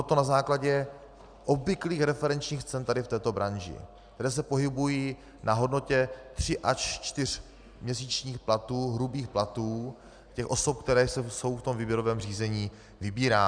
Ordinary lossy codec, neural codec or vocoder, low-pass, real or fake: Opus, 64 kbps; none; 9.9 kHz; real